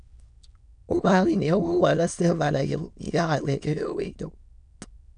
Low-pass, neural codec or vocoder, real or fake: 9.9 kHz; autoencoder, 22.05 kHz, a latent of 192 numbers a frame, VITS, trained on many speakers; fake